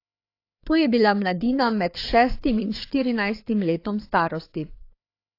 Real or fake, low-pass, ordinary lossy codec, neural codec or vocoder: fake; 5.4 kHz; AAC, 32 kbps; codec, 16 kHz, 4 kbps, FreqCodec, larger model